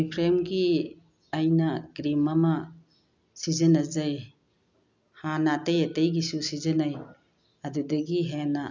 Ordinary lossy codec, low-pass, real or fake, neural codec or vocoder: none; 7.2 kHz; real; none